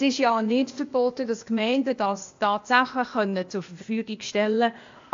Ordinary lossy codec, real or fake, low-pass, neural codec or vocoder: AAC, 48 kbps; fake; 7.2 kHz; codec, 16 kHz, 0.8 kbps, ZipCodec